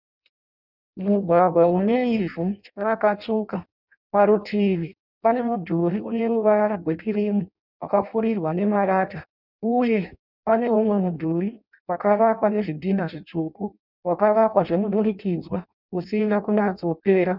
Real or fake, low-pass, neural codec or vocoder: fake; 5.4 kHz; codec, 16 kHz in and 24 kHz out, 0.6 kbps, FireRedTTS-2 codec